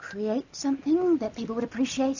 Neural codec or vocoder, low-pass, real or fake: none; 7.2 kHz; real